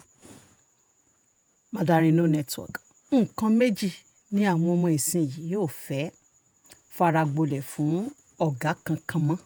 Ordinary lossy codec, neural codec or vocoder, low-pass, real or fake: none; vocoder, 48 kHz, 128 mel bands, Vocos; none; fake